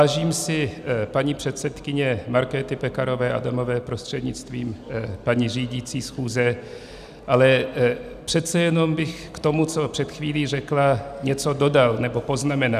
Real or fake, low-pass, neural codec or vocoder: real; 14.4 kHz; none